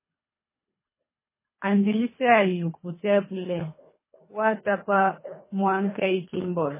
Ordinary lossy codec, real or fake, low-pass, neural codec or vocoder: MP3, 16 kbps; fake; 3.6 kHz; codec, 24 kHz, 3 kbps, HILCodec